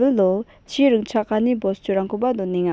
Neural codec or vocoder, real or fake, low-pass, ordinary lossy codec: none; real; none; none